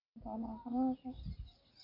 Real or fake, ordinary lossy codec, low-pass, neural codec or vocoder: real; AAC, 24 kbps; 5.4 kHz; none